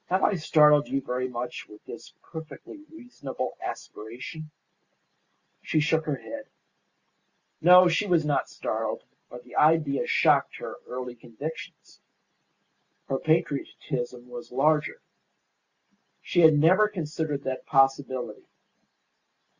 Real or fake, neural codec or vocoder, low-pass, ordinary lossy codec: real; none; 7.2 kHz; Opus, 64 kbps